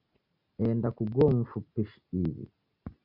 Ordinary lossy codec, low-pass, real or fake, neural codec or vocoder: MP3, 48 kbps; 5.4 kHz; real; none